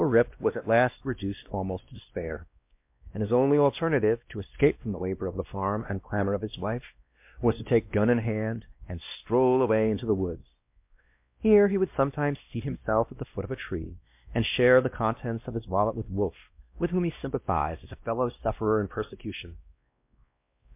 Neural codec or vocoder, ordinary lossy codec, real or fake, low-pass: codec, 16 kHz, 1 kbps, X-Codec, WavLM features, trained on Multilingual LibriSpeech; MP3, 32 kbps; fake; 3.6 kHz